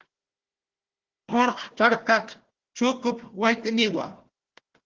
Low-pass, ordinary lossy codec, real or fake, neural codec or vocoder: 7.2 kHz; Opus, 16 kbps; fake; codec, 16 kHz, 1 kbps, FunCodec, trained on Chinese and English, 50 frames a second